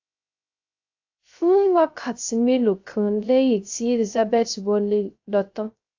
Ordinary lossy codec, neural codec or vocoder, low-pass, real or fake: AAC, 48 kbps; codec, 16 kHz, 0.3 kbps, FocalCodec; 7.2 kHz; fake